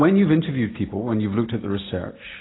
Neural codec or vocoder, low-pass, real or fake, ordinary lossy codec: none; 7.2 kHz; real; AAC, 16 kbps